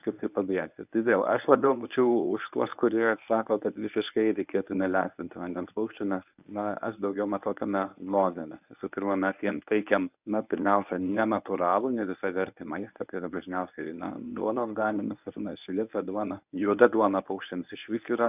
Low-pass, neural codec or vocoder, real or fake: 3.6 kHz; codec, 24 kHz, 0.9 kbps, WavTokenizer, medium speech release version 1; fake